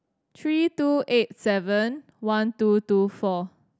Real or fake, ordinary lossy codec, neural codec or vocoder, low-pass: real; none; none; none